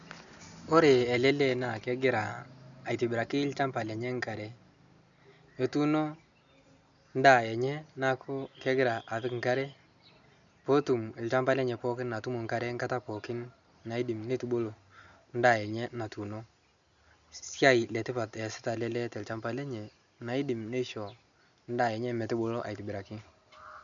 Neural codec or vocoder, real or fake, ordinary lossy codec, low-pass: none; real; MP3, 96 kbps; 7.2 kHz